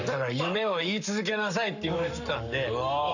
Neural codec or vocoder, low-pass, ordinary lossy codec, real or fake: codec, 44.1 kHz, 7.8 kbps, Pupu-Codec; 7.2 kHz; none; fake